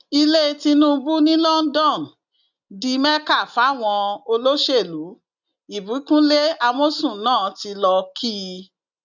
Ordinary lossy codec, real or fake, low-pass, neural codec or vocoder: none; real; 7.2 kHz; none